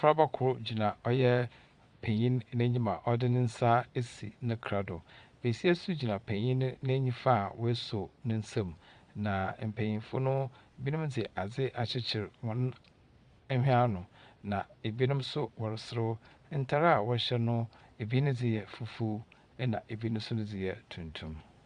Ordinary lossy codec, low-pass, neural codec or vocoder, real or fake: Opus, 64 kbps; 9.9 kHz; vocoder, 22.05 kHz, 80 mel bands, Vocos; fake